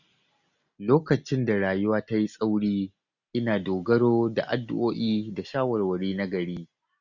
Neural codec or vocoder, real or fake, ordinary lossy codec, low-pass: none; real; none; 7.2 kHz